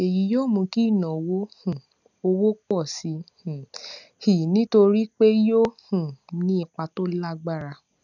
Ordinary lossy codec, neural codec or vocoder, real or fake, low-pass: none; none; real; 7.2 kHz